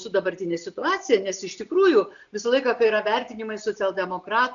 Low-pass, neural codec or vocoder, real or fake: 7.2 kHz; none; real